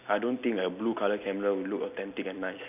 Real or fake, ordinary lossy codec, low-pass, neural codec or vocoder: real; none; 3.6 kHz; none